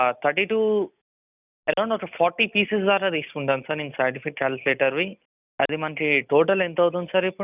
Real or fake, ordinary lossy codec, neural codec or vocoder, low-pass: real; none; none; 3.6 kHz